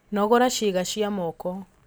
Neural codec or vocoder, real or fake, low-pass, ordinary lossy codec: none; real; none; none